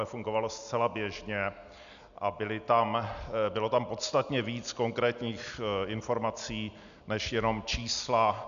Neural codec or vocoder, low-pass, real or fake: none; 7.2 kHz; real